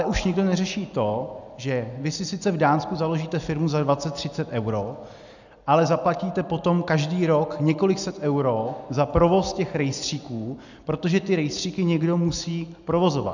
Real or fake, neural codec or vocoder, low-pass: real; none; 7.2 kHz